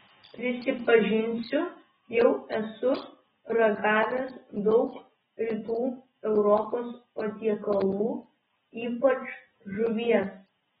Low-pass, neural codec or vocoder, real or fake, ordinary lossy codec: 7.2 kHz; none; real; AAC, 16 kbps